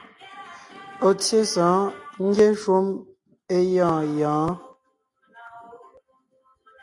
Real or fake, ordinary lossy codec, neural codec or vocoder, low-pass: real; AAC, 48 kbps; none; 10.8 kHz